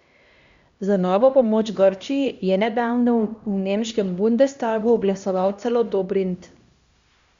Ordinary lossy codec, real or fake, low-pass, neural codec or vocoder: Opus, 64 kbps; fake; 7.2 kHz; codec, 16 kHz, 1 kbps, X-Codec, HuBERT features, trained on LibriSpeech